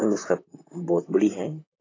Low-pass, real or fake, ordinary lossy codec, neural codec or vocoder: 7.2 kHz; fake; AAC, 32 kbps; vocoder, 44.1 kHz, 128 mel bands, Pupu-Vocoder